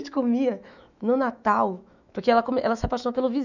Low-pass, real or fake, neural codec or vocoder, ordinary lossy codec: 7.2 kHz; fake; autoencoder, 48 kHz, 128 numbers a frame, DAC-VAE, trained on Japanese speech; none